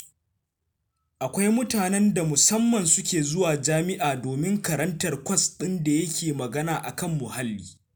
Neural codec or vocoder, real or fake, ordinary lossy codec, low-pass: none; real; none; none